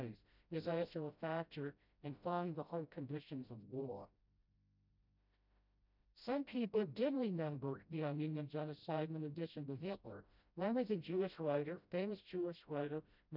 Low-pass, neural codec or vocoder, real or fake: 5.4 kHz; codec, 16 kHz, 0.5 kbps, FreqCodec, smaller model; fake